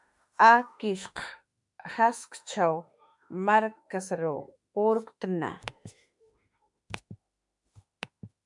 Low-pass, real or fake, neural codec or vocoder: 10.8 kHz; fake; autoencoder, 48 kHz, 32 numbers a frame, DAC-VAE, trained on Japanese speech